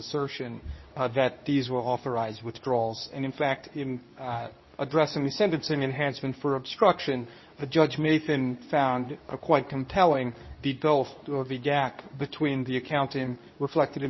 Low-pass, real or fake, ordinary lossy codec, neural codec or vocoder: 7.2 kHz; fake; MP3, 24 kbps; codec, 24 kHz, 0.9 kbps, WavTokenizer, medium speech release version 2